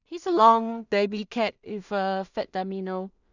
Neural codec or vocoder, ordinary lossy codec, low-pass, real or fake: codec, 16 kHz in and 24 kHz out, 0.4 kbps, LongCat-Audio-Codec, two codebook decoder; none; 7.2 kHz; fake